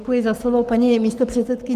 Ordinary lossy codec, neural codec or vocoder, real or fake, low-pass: Opus, 32 kbps; codec, 44.1 kHz, 7.8 kbps, Pupu-Codec; fake; 14.4 kHz